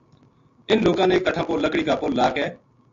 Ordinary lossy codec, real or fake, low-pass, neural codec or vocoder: AAC, 48 kbps; real; 7.2 kHz; none